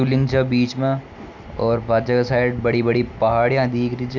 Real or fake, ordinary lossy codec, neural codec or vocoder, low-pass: real; none; none; 7.2 kHz